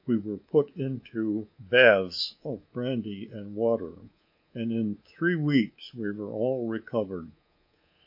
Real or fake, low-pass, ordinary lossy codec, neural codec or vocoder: fake; 5.4 kHz; MP3, 32 kbps; codec, 24 kHz, 3.1 kbps, DualCodec